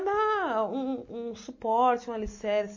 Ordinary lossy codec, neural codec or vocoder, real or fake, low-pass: MP3, 32 kbps; none; real; 7.2 kHz